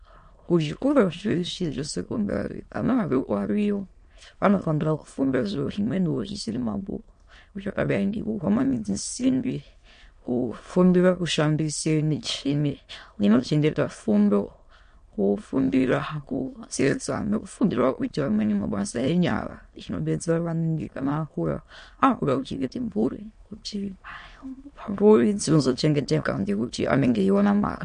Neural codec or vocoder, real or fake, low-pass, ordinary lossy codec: autoencoder, 22.05 kHz, a latent of 192 numbers a frame, VITS, trained on many speakers; fake; 9.9 kHz; MP3, 48 kbps